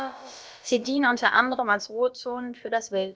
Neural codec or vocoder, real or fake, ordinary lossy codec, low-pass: codec, 16 kHz, about 1 kbps, DyCAST, with the encoder's durations; fake; none; none